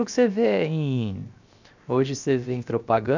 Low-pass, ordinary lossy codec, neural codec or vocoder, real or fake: 7.2 kHz; none; codec, 16 kHz, 0.7 kbps, FocalCodec; fake